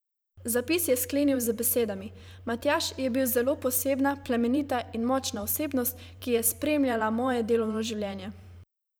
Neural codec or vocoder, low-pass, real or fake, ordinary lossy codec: vocoder, 44.1 kHz, 128 mel bands every 512 samples, BigVGAN v2; none; fake; none